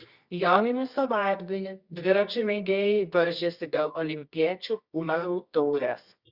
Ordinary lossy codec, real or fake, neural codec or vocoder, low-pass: Opus, 64 kbps; fake; codec, 24 kHz, 0.9 kbps, WavTokenizer, medium music audio release; 5.4 kHz